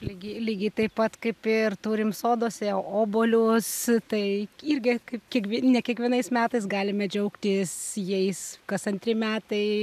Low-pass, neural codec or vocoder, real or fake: 14.4 kHz; none; real